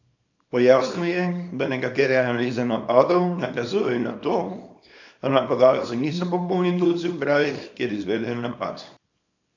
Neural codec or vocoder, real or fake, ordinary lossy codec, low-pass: codec, 24 kHz, 0.9 kbps, WavTokenizer, small release; fake; none; 7.2 kHz